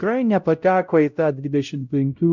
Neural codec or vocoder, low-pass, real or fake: codec, 16 kHz, 0.5 kbps, X-Codec, WavLM features, trained on Multilingual LibriSpeech; 7.2 kHz; fake